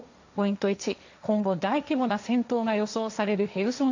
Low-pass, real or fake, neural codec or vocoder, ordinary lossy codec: 7.2 kHz; fake; codec, 16 kHz, 1.1 kbps, Voila-Tokenizer; none